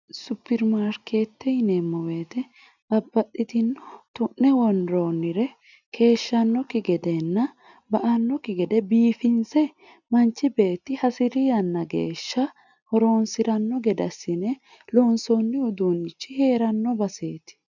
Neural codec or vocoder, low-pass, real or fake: none; 7.2 kHz; real